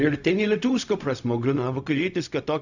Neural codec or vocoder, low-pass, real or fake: codec, 16 kHz, 0.4 kbps, LongCat-Audio-Codec; 7.2 kHz; fake